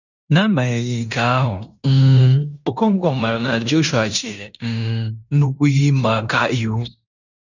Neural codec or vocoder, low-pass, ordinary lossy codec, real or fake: codec, 16 kHz in and 24 kHz out, 0.9 kbps, LongCat-Audio-Codec, fine tuned four codebook decoder; 7.2 kHz; none; fake